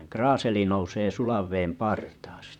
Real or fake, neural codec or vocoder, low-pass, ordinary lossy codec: fake; vocoder, 44.1 kHz, 128 mel bands, Pupu-Vocoder; 19.8 kHz; none